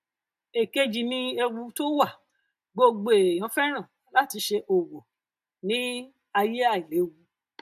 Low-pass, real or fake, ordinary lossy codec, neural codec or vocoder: 14.4 kHz; real; AAC, 96 kbps; none